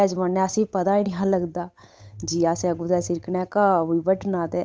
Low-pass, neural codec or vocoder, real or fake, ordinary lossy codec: none; codec, 16 kHz, 8 kbps, FunCodec, trained on Chinese and English, 25 frames a second; fake; none